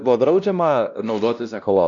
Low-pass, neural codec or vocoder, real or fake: 7.2 kHz; codec, 16 kHz, 1 kbps, X-Codec, WavLM features, trained on Multilingual LibriSpeech; fake